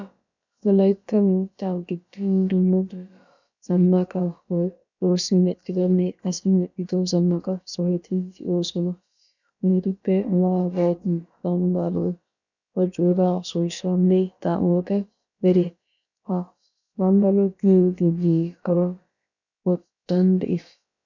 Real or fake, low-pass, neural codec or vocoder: fake; 7.2 kHz; codec, 16 kHz, about 1 kbps, DyCAST, with the encoder's durations